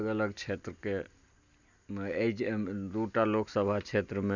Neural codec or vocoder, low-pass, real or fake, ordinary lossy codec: none; 7.2 kHz; real; none